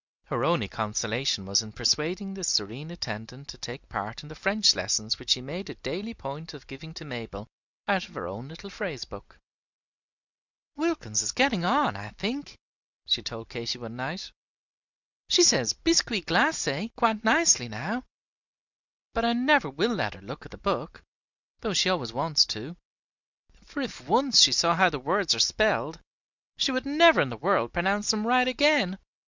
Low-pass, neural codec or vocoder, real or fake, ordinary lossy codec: 7.2 kHz; none; real; Opus, 64 kbps